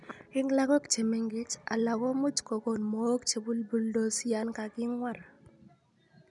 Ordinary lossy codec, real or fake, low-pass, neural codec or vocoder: none; real; 10.8 kHz; none